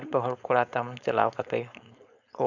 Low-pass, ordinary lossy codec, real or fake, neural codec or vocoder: 7.2 kHz; none; fake; codec, 16 kHz, 4.8 kbps, FACodec